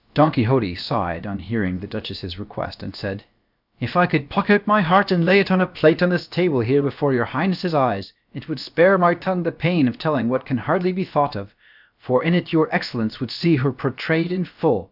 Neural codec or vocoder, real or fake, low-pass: codec, 16 kHz, about 1 kbps, DyCAST, with the encoder's durations; fake; 5.4 kHz